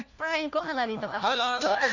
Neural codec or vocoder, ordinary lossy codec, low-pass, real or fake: codec, 16 kHz, 1 kbps, FunCodec, trained on LibriTTS, 50 frames a second; none; 7.2 kHz; fake